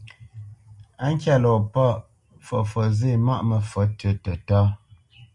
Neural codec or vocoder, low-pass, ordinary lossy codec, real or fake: none; 10.8 kHz; MP3, 96 kbps; real